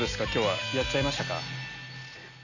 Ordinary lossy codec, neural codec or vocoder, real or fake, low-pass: none; none; real; 7.2 kHz